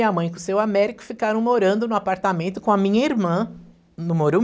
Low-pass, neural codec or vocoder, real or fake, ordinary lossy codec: none; none; real; none